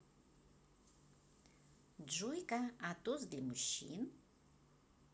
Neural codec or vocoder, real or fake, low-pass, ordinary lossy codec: none; real; none; none